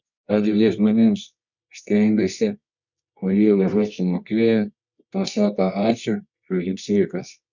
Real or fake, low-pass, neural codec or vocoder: fake; 7.2 kHz; codec, 24 kHz, 0.9 kbps, WavTokenizer, medium music audio release